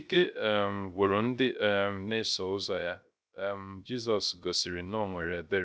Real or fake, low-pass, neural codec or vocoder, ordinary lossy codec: fake; none; codec, 16 kHz, 0.3 kbps, FocalCodec; none